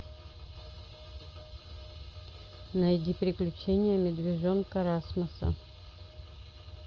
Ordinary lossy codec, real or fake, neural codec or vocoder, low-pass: Opus, 24 kbps; real; none; 7.2 kHz